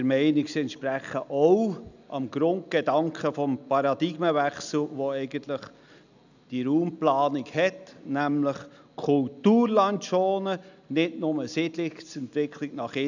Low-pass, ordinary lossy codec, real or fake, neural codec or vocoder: 7.2 kHz; none; real; none